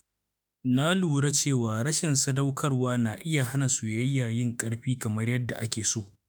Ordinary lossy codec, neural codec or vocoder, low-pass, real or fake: none; autoencoder, 48 kHz, 32 numbers a frame, DAC-VAE, trained on Japanese speech; none; fake